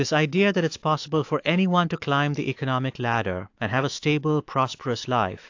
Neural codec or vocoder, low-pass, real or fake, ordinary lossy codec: autoencoder, 48 kHz, 128 numbers a frame, DAC-VAE, trained on Japanese speech; 7.2 kHz; fake; AAC, 48 kbps